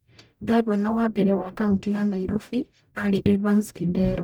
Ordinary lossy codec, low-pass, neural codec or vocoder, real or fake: none; none; codec, 44.1 kHz, 0.9 kbps, DAC; fake